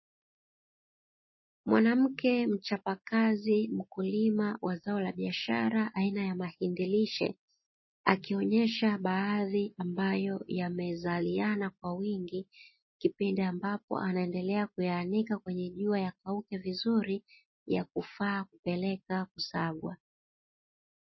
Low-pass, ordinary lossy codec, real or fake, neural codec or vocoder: 7.2 kHz; MP3, 24 kbps; real; none